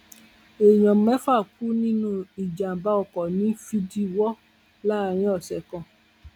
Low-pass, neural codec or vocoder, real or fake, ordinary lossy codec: 19.8 kHz; none; real; none